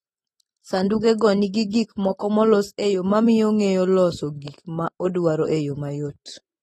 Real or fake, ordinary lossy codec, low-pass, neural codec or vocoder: real; AAC, 32 kbps; 19.8 kHz; none